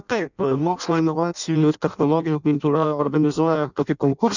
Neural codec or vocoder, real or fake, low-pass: codec, 16 kHz in and 24 kHz out, 0.6 kbps, FireRedTTS-2 codec; fake; 7.2 kHz